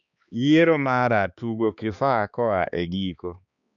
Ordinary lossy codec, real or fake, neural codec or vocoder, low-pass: none; fake; codec, 16 kHz, 2 kbps, X-Codec, HuBERT features, trained on balanced general audio; 7.2 kHz